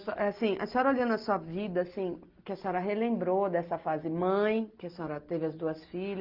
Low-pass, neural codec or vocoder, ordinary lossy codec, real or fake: 5.4 kHz; none; Opus, 24 kbps; real